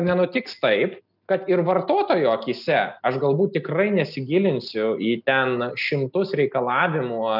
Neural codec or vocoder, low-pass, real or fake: none; 5.4 kHz; real